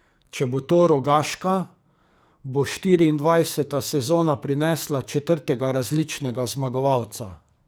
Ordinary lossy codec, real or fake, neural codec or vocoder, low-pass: none; fake; codec, 44.1 kHz, 2.6 kbps, SNAC; none